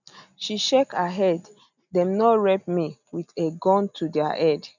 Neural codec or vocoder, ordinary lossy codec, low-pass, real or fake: none; none; 7.2 kHz; real